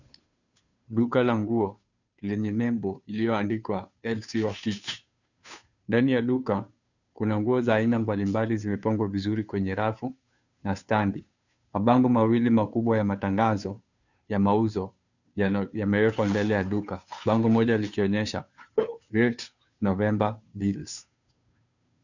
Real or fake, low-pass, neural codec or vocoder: fake; 7.2 kHz; codec, 16 kHz, 2 kbps, FunCodec, trained on Chinese and English, 25 frames a second